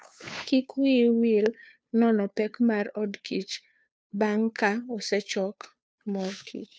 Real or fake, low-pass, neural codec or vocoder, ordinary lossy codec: fake; none; codec, 16 kHz, 2 kbps, FunCodec, trained on Chinese and English, 25 frames a second; none